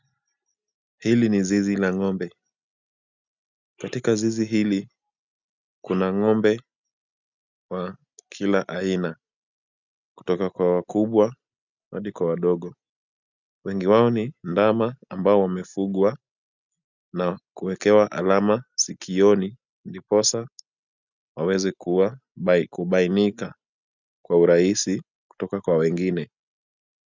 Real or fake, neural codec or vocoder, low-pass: real; none; 7.2 kHz